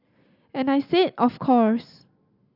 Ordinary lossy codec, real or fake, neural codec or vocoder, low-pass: none; real; none; 5.4 kHz